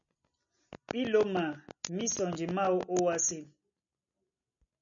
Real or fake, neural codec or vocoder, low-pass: real; none; 7.2 kHz